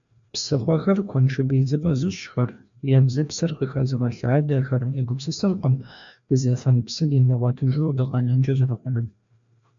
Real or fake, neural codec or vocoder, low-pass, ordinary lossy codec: fake; codec, 16 kHz, 1 kbps, FreqCodec, larger model; 7.2 kHz; MP3, 64 kbps